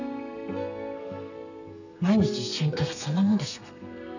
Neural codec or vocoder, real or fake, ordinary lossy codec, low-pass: codec, 32 kHz, 1.9 kbps, SNAC; fake; none; 7.2 kHz